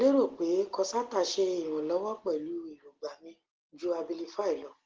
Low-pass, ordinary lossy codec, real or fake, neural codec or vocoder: 7.2 kHz; Opus, 16 kbps; real; none